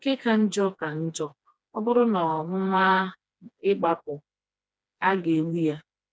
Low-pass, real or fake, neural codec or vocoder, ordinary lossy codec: none; fake; codec, 16 kHz, 2 kbps, FreqCodec, smaller model; none